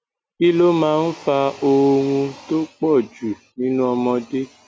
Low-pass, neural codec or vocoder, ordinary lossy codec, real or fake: none; none; none; real